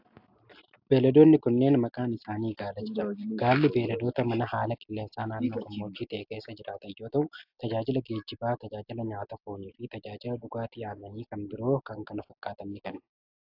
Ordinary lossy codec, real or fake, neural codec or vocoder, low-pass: AAC, 48 kbps; real; none; 5.4 kHz